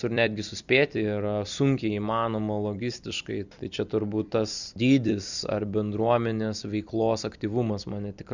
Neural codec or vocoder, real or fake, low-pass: vocoder, 44.1 kHz, 128 mel bands every 256 samples, BigVGAN v2; fake; 7.2 kHz